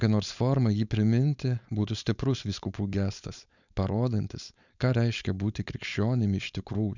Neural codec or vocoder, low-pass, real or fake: codec, 16 kHz, 4.8 kbps, FACodec; 7.2 kHz; fake